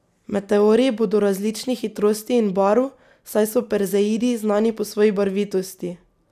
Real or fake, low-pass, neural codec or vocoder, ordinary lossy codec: real; 14.4 kHz; none; none